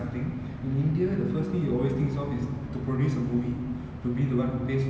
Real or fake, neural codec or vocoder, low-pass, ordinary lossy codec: real; none; none; none